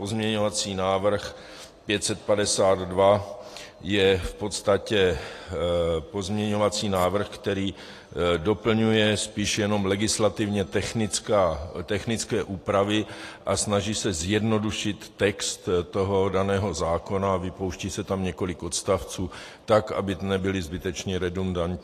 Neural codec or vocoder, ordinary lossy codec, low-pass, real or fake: none; AAC, 48 kbps; 14.4 kHz; real